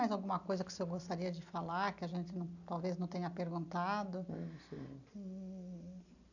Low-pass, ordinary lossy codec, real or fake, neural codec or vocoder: 7.2 kHz; none; real; none